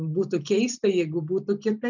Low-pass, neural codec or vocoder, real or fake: 7.2 kHz; none; real